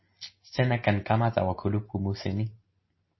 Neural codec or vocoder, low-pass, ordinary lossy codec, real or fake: none; 7.2 kHz; MP3, 24 kbps; real